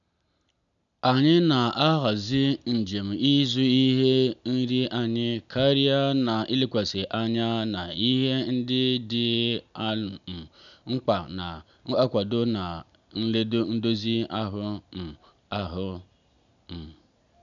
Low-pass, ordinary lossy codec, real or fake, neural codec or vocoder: 7.2 kHz; none; real; none